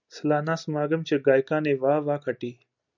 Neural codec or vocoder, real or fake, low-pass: none; real; 7.2 kHz